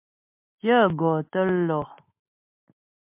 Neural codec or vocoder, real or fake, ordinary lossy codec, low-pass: none; real; MP3, 32 kbps; 3.6 kHz